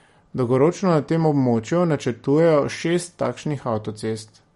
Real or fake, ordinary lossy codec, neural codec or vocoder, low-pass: real; MP3, 48 kbps; none; 19.8 kHz